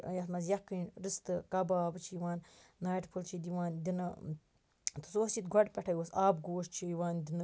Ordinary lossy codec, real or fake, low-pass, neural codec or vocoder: none; real; none; none